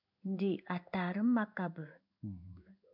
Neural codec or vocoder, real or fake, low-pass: codec, 16 kHz in and 24 kHz out, 1 kbps, XY-Tokenizer; fake; 5.4 kHz